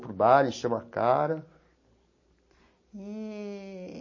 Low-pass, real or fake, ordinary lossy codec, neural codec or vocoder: 7.2 kHz; real; MP3, 32 kbps; none